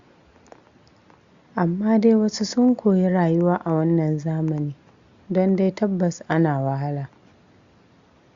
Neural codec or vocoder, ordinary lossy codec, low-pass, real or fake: none; Opus, 64 kbps; 7.2 kHz; real